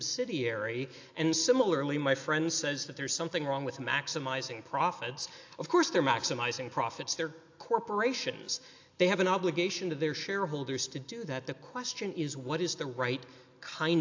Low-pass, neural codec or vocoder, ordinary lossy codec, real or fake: 7.2 kHz; none; AAC, 48 kbps; real